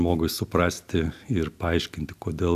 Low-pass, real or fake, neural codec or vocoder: 14.4 kHz; real; none